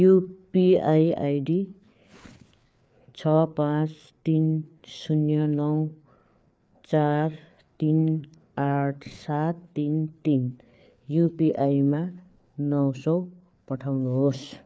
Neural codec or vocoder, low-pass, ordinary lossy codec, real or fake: codec, 16 kHz, 4 kbps, FreqCodec, larger model; none; none; fake